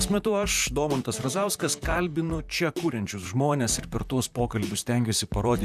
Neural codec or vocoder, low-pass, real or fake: vocoder, 44.1 kHz, 128 mel bands, Pupu-Vocoder; 14.4 kHz; fake